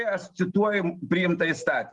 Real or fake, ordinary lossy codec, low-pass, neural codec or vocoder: real; Opus, 24 kbps; 10.8 kHz; none